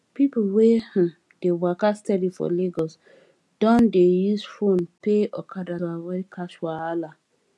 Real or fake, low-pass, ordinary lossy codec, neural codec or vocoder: real; none; none; none